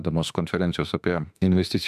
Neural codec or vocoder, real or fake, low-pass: autoencoder, 48 kHz, 32 numbers a frame, DAC-VAE, trained on Japanese speech; fake; 14.4 kHz